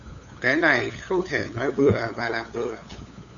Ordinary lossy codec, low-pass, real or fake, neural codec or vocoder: Opus, 64 kbps; 7.2 kHz; fake; codec, 16 kHz, 8 kbps, FunCodec, trained on LibriTTS, 25 frames a second